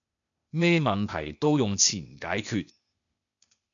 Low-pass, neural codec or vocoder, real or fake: 7.2 kHz; codec, 16 kHz, 0.8 kbps, ZipCodec; fake